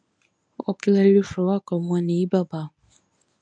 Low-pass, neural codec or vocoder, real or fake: 9.9 kHz; codec, 24 kHz, 0.9 kbps, WavTokenizer, medium speech release version 1; fake